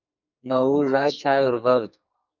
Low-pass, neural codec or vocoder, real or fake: 7.2 kHz; codec, 44.1 kHz, 2.6 kbps, SNAC; fake